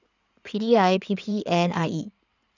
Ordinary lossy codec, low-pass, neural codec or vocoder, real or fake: none; 7.2 kHz; codec, 16 kHz in and 24 kHz out, 2.2 kbps, FireRedTTS-2 codec; fake